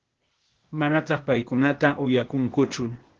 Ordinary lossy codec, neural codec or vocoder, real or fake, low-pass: Opus, 16 kbps; codec, 16 kHz, 0.8 kbps, ZipCodec; fake; 7.2 kHz